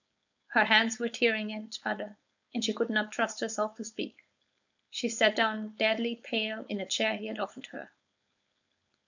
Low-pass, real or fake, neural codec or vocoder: 7.2 kHz; fake; codec, 16 kHz, 4.8 kbps, FACodec